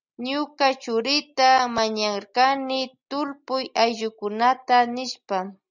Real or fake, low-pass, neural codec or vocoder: real; 7.2 kHz; none